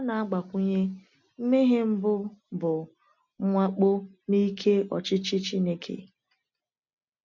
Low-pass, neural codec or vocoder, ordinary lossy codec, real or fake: 7.2 kHz; none; none; real